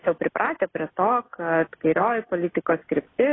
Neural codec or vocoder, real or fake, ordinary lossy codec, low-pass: none; real; AAC, 16 kbps; 7.2 kHz